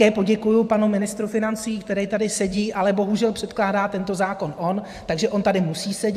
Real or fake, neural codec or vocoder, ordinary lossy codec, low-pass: fake; vocoder, 44.1 kHz, 128 mel bands every 512 samples, BigVGAN v2; AAC, 96 kbps; 14.4 kHz